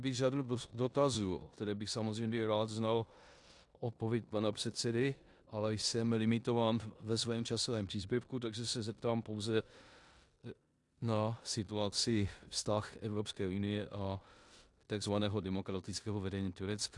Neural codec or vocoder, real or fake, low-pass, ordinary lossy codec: codec, 16 kHz in and 24 kHz out, 0.9 kbps, LongCat-Audio-Codec, four codebook decoder; fake; 10.8 kHz; AAC, 64 kbps